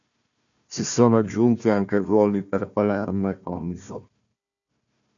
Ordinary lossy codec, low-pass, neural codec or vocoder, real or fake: MP3, 64 kbps; 7.2 kHz; codec, 16 kHz, 1 kbps, FunCodec, trained on Chinese and English, 50 frames a second; fake